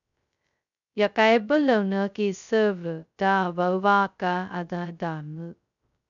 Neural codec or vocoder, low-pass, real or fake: codec, 16 kHz, 0.2 kbps, FocalCodec; 7.2 kHz; fake